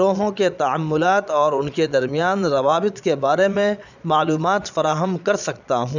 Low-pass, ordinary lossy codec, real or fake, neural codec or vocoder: 7.2 kHz; none; fake; vocoder, 22.05 kHz, 80 mel bands, Vocos